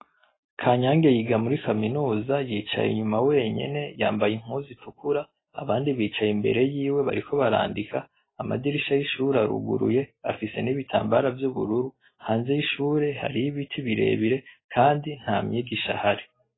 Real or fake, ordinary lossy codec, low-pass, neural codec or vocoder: real; AAC, 16 kbps; 7.2 kHz; none